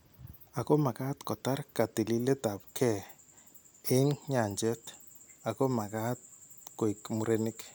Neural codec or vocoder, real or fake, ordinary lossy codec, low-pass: none; real; none; none